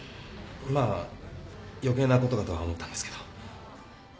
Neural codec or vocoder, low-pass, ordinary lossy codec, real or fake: none; none; none; real